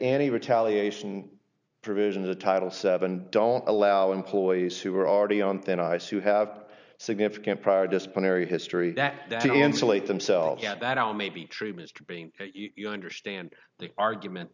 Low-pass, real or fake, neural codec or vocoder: 7.2 kHz; real; none